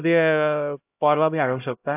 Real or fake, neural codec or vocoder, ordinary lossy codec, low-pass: fake; codec, 16 kHz, 0.5 kbps, X-Codec, HuBERT features, trained on LibriSpeech; AAC, 32 kbps; 3.6 kHz